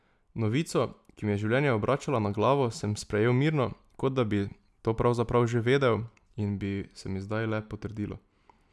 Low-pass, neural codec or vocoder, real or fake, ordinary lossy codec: none; none; real; none